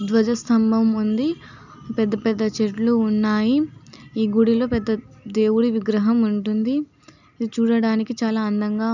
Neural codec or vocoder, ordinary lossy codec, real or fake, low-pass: none; none; real; 7.2 kHz